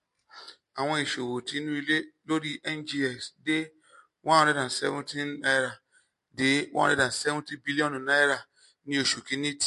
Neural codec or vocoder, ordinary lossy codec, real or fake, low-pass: none; MP3, 48 kbps; real; 14.4 kHz